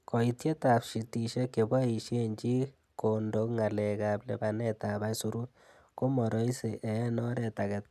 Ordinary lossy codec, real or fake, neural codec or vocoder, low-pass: none; real; none; 14.4 kHz